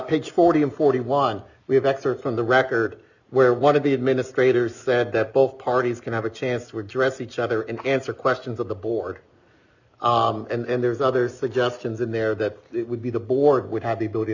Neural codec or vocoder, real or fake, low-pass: none; real; 7.2 kHz